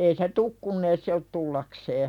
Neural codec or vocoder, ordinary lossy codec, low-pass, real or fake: none; none; 19.8 kHz; real